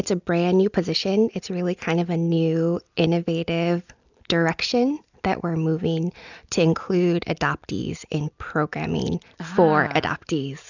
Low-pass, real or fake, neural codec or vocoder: 7.2 kHz; real; none